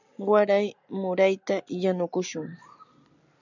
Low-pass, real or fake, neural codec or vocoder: 7.2 kHz; real; none